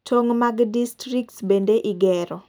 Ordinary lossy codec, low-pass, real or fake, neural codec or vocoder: none; none; real; none